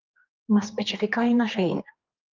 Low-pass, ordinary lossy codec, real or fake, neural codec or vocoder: 7.2 kHz; Opus, 32 kbps; fake; codec, 16 kHz, 2 kbps, X-Codec, HuBERT features, trained on general audio